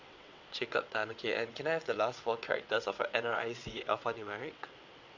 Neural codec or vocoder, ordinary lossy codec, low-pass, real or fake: vocoder, 22.05 kHz, 80 mel bands, WaveNeXt; MP3, 64 kbps; 7.2 kHz; fake